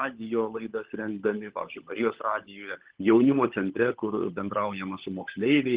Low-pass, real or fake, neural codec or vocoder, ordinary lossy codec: 3.6 kHz; fake; codec, 16 kHz, 16 kbps, FunCodec, trained on LibriTTS, 50 frames a second; Opus, 16 kbps